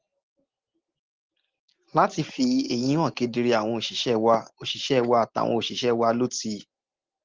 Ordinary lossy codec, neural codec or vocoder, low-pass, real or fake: Opus, 16 kbps; none; 7.2 kHz; real